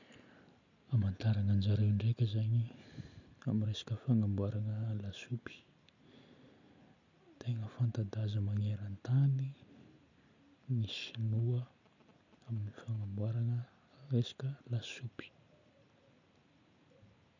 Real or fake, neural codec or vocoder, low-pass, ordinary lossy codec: real; none; 7.2 kHz; none